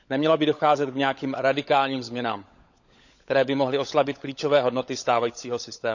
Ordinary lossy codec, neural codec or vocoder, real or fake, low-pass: none; codec, 16 kHz, 16 kbps, FunCodec, trained on LibriTTS, 50 frames a second; fake; 7.2 kHz